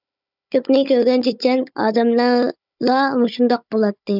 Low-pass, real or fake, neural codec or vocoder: 5.4 kHz; fake; codec, 16 kHz, 16 kbps, FunCodec, trained on Chinese and English, 50 frames a second